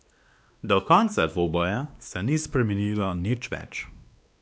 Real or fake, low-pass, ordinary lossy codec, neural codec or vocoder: fake; none; none; codec, 16 kHz, 2 kbps, X-Codec, WavLM features, trained on Multilingual LibriSpeech